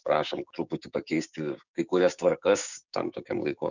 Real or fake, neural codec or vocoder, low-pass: fake; codec, 16 kHz, 6 kbps, DAC; 7.2 kHz